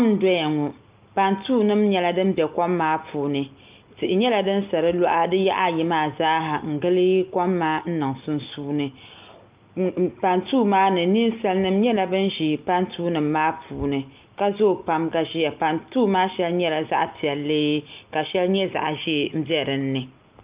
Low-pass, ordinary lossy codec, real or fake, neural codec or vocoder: 3.6 kHz; Opus, 24 kbps; real; none